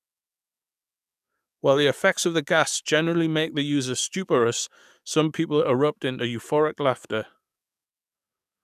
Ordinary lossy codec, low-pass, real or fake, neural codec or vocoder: none; 14.4 kHz; fake; codec, 44.1 kHz, 7.8 kbps, DAC